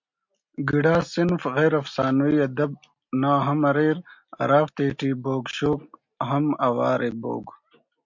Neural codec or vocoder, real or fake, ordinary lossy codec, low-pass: none; real; MP3, 48 kbps; 7.2 kHz